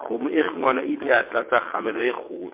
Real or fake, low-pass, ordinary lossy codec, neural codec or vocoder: fake; 3.6 kHz; MP3, 24 kbps; vocoder, 22.05 kHz, 80 mel bands, WaveNeXt